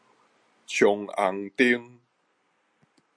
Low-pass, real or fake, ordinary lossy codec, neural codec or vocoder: 9.9 kHz; real; MP3, 48 kbps; none